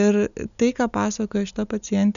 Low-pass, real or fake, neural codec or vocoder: 7.2 kHz; real; none